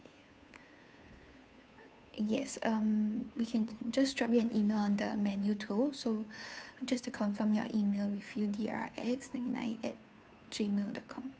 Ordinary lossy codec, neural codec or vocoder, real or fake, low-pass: none; codec, 16 kHz, 2 kbps, FunCodec, trained on Chinese and English, 25 frames a second; fake; none